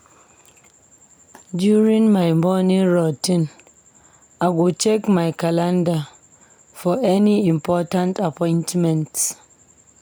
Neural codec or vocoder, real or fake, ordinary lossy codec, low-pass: none; real; none; none